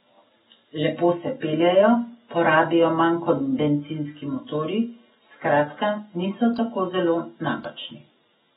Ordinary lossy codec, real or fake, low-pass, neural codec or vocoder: AAC, 16 kbps; real; 19.8 kHz; none